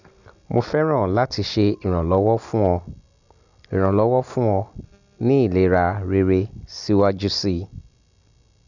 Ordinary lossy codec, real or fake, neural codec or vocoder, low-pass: none; real; none; 7.2 kHz